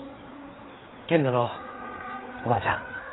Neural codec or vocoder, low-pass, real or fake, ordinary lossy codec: codec, 16 kHz, 4 kbps, FreqCodec, larger model; 7.2 kHz; fake; AAC, 16 kbps